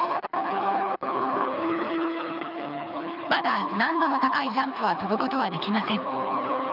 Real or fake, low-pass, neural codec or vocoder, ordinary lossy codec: fake; 5.4 kHz; codec, 24 kHz, 3 kbps, HILCodec; none